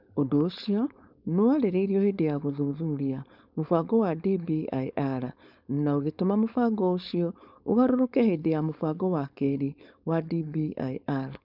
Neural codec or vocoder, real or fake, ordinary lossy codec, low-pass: codec, 16 kHz, 4.8 kbps, FACodec; fake; none; 5.4 kHz